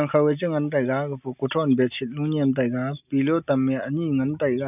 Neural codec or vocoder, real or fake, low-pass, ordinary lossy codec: none; real; 3.6 kHz; none